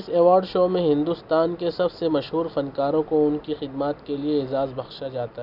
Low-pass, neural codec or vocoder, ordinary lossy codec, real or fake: 5.4 kHz; none; none; real